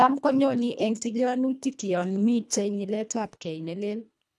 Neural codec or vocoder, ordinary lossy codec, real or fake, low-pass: codec, 24 kHz, 1.5 kbps, HILCodec; none; fake; none